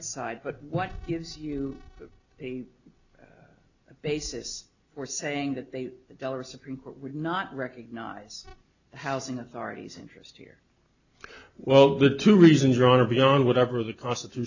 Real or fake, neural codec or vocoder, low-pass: real; none; 7.2 kHz